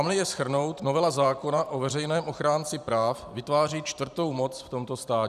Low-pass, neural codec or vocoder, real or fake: 14.4 kHz; vocoder, 44.1 kHz, 128 mel bands every 512 samples, BigVGAN v2; fake